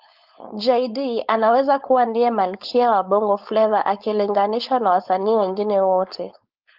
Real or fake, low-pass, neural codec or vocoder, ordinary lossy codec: fake; 5.4 kHz; codec, 16 kHz, 4.8 kbps, FACodec; Opus, 24 kbps